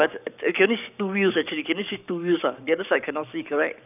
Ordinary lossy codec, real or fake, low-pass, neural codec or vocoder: none; fake; 3.6 kHz; codec, 44.1 kHz, 7.8 kbps, Pupu-Codec